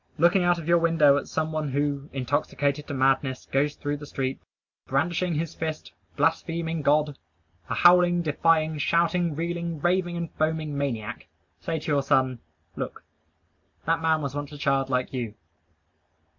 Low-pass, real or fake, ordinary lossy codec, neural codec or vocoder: 7.2 kHz; real; MP3, 64 kbps; none